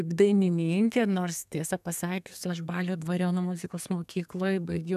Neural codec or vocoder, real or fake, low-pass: codec, 32 kHz, 1.9 kbps, SNAC; fake; 14.4 kHz